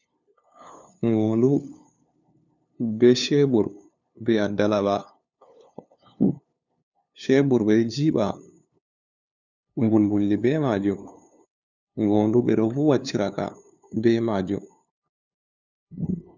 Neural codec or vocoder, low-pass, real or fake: codec, 16 kHz, 2 kbps, FunCodec, trained on LibriTTS, 25 frames a second; 7.2 kHz; fake